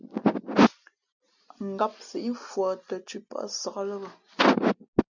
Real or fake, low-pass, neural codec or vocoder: real; 7.2 kHz; none